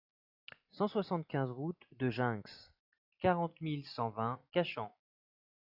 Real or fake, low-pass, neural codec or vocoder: real; 5.4 kHz; none